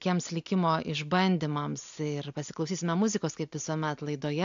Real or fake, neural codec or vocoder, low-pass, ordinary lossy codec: real; none; 7.2 kHz; AAC, 64 kbps